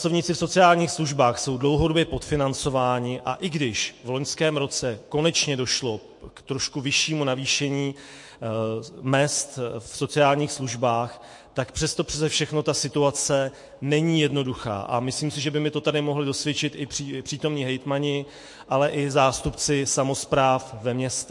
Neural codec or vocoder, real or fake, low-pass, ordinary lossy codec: autoencoder, 48 kHz, 128 numbers a frame, DAC-VAE, trained on Japanese speech; fake; 10.8 kHz; MP3, 48 kbps